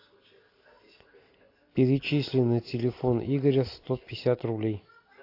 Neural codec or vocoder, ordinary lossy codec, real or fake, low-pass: none; AAC, 32 kbps; real; 5.4 kHz